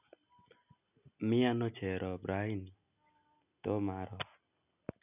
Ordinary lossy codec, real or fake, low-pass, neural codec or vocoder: none; real; 3.6 kHz; none